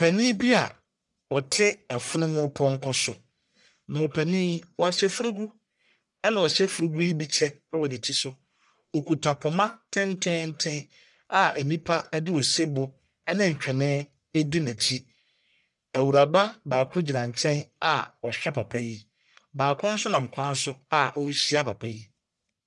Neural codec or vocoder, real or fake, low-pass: codec, 44.1 kHz, 1.7 kbps, Pupu-Codec; fake; 10.8 kHz